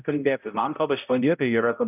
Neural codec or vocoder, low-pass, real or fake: codec, 16 kHz, 0.5 kbps, X-Codec, HuBERT features, trained on balanced general audio; 3.6 kHz; fake